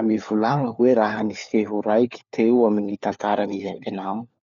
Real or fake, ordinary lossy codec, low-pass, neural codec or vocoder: fake; MP3, 96 kbps; 7.2 kHz; codec, 16 kHz, 4 kbps, FunCodec, trained on LibriTTS, 50 frames a second